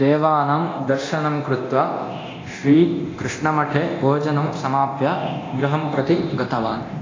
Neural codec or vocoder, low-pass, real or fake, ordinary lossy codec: codec, 24 kHz, 0.9 kbps, DualCodec; 7.2 kHz; fake; AAC, 32 kbps